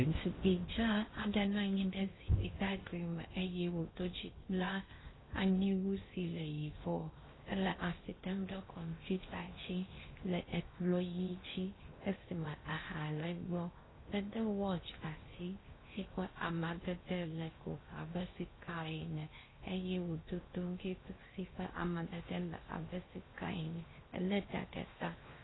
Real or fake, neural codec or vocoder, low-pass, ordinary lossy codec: fake; codec, 16 kHz in and 24 kHz out, 0.6 kbps, FocalCodec, streaming, 4096 codes; 7.2 kHz; AAC, 16 kbps